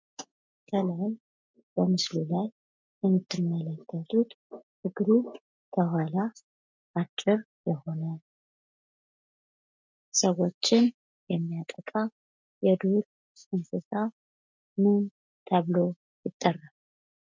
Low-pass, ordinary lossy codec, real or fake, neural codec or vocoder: 7.2 kHz; MP3, 64 kbps; real; none